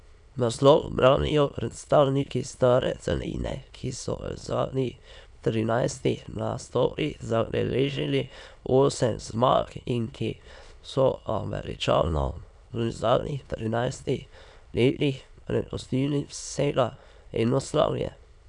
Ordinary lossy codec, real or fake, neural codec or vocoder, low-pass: none; fake; autoencoder, 22.05 kHz, a latent of 192 numbers a frame, VITS, trained on many speakers; 9.9 kHz